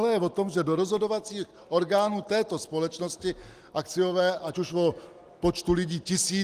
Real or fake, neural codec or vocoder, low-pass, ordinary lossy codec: real; none; 14.4 kHz; Opus, 24 kbps